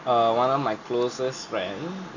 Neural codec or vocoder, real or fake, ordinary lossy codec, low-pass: none; real; none; 7.2 kHz